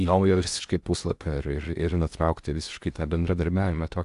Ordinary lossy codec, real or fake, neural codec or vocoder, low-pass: Opus, 64 kbps; fake; codec, 16 kHz in and 24 kHz out, 0.6 kbps, FocalCodec, streaming, 2048 codes; 10.8 kHz